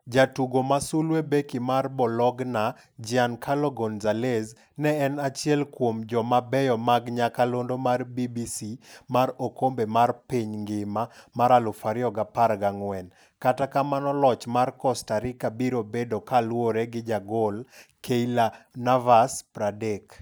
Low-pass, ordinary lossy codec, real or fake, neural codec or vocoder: none; none; real; none